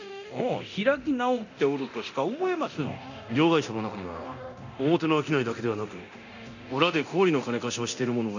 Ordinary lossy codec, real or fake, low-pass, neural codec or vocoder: none; fake; 7.2 kHz; codec, 24 kHz, 0.9 kbps, DualCodec